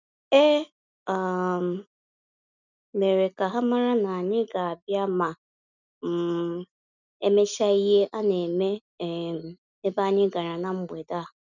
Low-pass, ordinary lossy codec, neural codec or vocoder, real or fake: 7.2 kHz; none; none; real